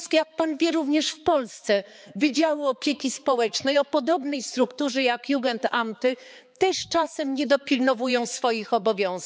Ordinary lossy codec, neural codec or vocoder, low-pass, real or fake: none; codec, 16 kHz, 4 kbps, X-Codec, HuBERT features, trained on balanced general audio; none; fake